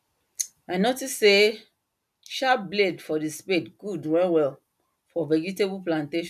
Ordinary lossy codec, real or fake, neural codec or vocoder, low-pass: none; real; none; 14.4 kHz